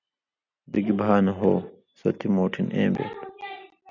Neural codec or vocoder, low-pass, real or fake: none; 7.2 kHz; real